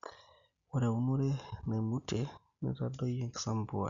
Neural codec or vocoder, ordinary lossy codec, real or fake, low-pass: none; none; real; 7.2 kHz